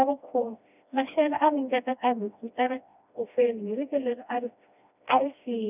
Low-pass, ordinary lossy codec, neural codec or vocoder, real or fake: 3.6 kHz; none; codec, 16 kHz, 1 kbps, FreqCodec, smaller model; fake